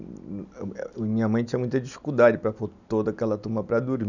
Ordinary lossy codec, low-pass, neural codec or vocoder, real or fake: none; 7.2 kHz; none; real